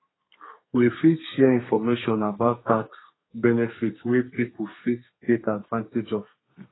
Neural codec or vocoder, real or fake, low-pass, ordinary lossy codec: codec, 32 kHz, 1.9 kbps, SNAC; fake; 7.2 kHz; AAC, 16 kbps